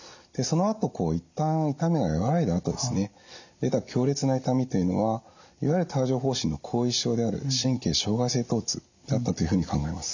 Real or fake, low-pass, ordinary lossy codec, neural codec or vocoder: real; 7.2 kHz; none; none